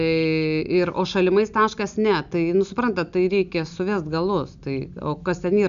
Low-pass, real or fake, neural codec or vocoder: 7.2 kHz; real; none